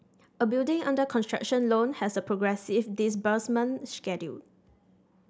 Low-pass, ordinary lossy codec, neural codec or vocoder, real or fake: none; none; none; real